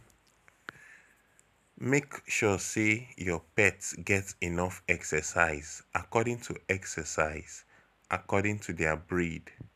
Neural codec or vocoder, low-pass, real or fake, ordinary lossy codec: none; 14.4 kHz; real; none